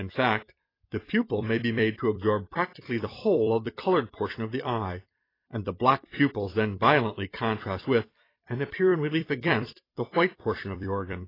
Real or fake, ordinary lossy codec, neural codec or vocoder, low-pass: fake; AAC, 24 kbps; vocoder, 44.1 kHz, 128 mel bands every 256 samples, BigVGAN v2; 5.4 kHz